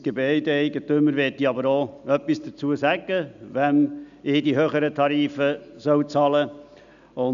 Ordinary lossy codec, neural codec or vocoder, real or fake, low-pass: MP3, 96 kbps; none; real; 7.2 kHz